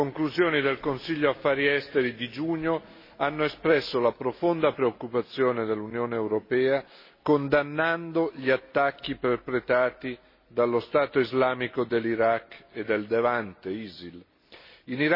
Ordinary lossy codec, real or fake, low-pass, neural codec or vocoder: MP3, 24 kbps; real; 5.4 kHz; none